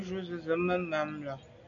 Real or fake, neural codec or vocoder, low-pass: real; none; 7.2 kHz